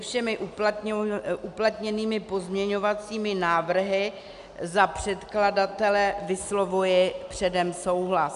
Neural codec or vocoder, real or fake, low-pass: none; real; 10.8 kHz